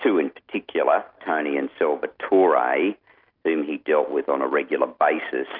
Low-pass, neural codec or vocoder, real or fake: 5.4 kHz; none; real